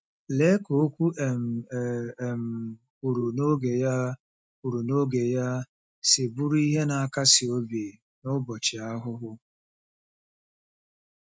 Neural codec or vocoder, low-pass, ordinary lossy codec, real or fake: none; none; none; real